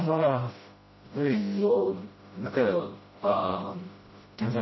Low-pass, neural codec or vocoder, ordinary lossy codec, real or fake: 7.2 kHz; codec, 16 kHz, 0.5 kbps, FreqCodec, smaller model; MP3, 24 kbps; fake